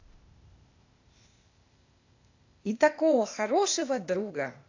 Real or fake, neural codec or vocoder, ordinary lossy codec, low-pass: fake; codec, 16 kHz, 0.8 kbps, ZipCodec; AAC, 48 kbps; 7.2 kHz